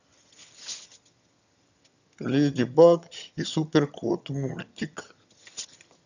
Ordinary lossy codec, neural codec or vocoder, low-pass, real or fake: none; vocoder, 22.05 kHz, 80 mel bands, HiFi-GAN; 7.2 kHz; fake